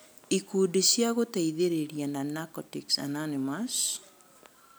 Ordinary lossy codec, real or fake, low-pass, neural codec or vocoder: none; real; none; none